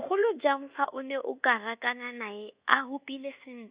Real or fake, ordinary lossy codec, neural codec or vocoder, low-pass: fake; Opus, 64 kbps; codec, 24 kHz, 1.2 kbps, DualCodec; 3.6 kHz